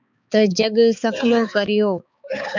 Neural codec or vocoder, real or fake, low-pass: codec, 16 kHz, 4 kbps, X-Codec, HuBERT features, trained on balanced general audio; fake; 7.2 kHz